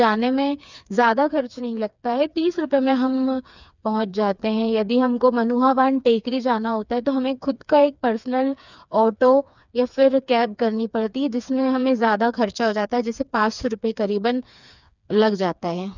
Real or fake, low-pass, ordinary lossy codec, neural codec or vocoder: fake; 7.2 kHz; none; codec, 16 kHz, 4 kbps, FreqCodec, smaller model